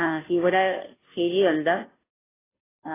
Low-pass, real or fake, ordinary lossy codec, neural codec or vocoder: 3.6 kHz; fake; AAC, 16 kbps; codec, 16 kHz, 0.5 kbps, FunCodec, trained on Chinese and English, 25 frames a second